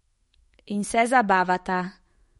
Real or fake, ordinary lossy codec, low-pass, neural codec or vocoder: fake; MP3, 48 kbps; 19.8 kHz; autoencoder, 48 kHz, 128 numbers a frame, DAC-VAE, trained on Japanese speech